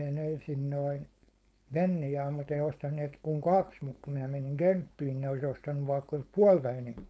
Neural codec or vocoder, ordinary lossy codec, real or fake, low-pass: codec, 16 kHz, 4.8 kbps, FACodec; none; fake; none